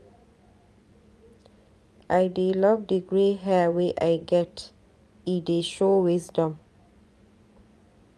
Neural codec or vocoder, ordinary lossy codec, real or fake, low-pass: vocoder, 24 kHz, 100 mel bands, Vocos; none; fake; none